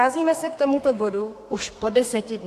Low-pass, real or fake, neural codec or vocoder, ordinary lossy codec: 14.4 kHz; fake; codec, 32 kHz, 1.9 kbps, SNAC; AAC, 64 kbps